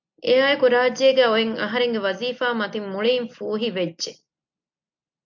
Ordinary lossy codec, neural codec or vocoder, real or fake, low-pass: MP3, 48 kbps; none; real; 7.2 kHz